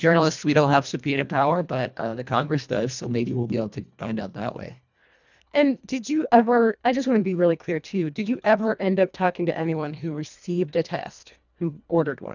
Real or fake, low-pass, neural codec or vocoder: fake; 7.2 kHz; codec, 24 kHz, 1.5 kbps, HILCodec